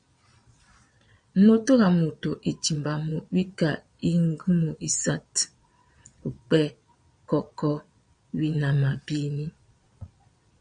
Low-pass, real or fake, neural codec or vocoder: 9.9 kHz; fake; vocoder, 22.05 kHz, 80 mel bands, Vocos